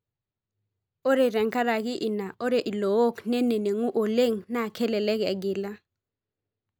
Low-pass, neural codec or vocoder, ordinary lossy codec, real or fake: none; none; none; real